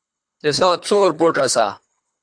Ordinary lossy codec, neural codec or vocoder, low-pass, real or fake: AAC, 64 kbps; codec, 24 kHz, 3 kbps, HILCodec; 9.9 kHz; fake